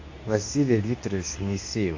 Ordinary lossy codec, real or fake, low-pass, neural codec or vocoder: AAC, 48 kbps; fake; 7.2 kHz; codec, 24 kHz, 0.9 kbps, WavTokenizer, medium speech release version 2